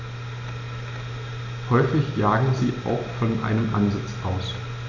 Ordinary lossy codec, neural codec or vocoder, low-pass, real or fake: none; none; 7.2 kHz; real